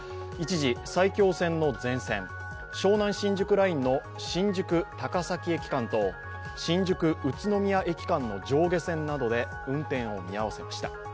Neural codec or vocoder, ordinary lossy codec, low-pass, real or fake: none; none; none; real